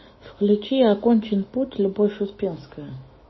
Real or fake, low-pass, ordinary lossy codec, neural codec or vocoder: fake; 7.2 kHz; MP3, 24 kbps; autoencoder, 48 kHz, 128 numbers a frame, DAC-VAE, trained on Japanese speech